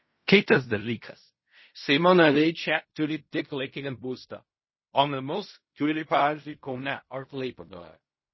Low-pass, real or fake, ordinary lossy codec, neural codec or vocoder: 7.2 kHz; fake; MP3, 24 kbps; codec, 16 kHz in and 24 kHz out, 0.4 kbps, LongCat-Audio-Codec, fine tuned four codebook decoder